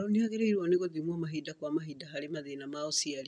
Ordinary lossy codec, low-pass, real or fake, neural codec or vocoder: none; 9.9 kHz; real; none